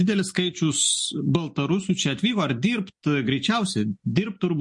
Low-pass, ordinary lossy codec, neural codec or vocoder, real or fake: 10.8 kHz; MP3, 48 kbps; none; real